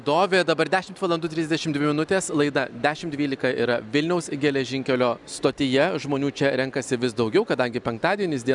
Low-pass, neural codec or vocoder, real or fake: 10.8 kHz; none; real